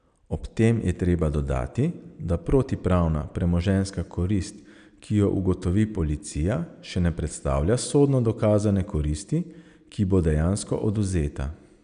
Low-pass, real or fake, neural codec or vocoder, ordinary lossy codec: 9.9 kHz; real; none; none